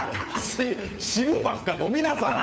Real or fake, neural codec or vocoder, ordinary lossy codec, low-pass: fake; codec, 16 kHz, 4 kbps, FunCodec, trained on LibriTTS, 50 frames a second; none; none